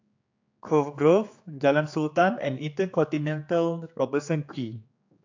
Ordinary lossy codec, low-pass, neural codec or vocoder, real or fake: AAC, 48 kbps; 7.2 kHz; codec, 16 kHz, 4 kbps, X-Codec, HuBERT features, trained on general audio; fake